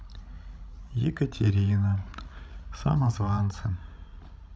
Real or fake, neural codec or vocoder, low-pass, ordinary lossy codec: fake; codec, 16 kHz, 16 kbps, FreqCodec, larger model; none; none